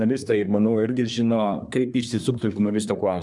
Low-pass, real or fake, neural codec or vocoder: 10.8 kHz; fake; codec, 24 kHz, 1 kbps, SNAC